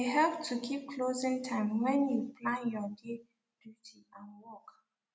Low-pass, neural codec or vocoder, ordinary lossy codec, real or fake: none; none; none; real